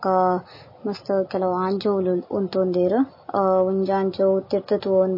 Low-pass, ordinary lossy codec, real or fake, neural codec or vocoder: 5.4 kHz; MP3, 24 kbps; real; none